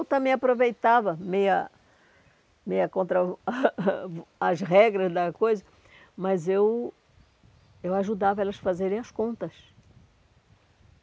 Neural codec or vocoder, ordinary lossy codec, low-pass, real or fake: none; none; none; real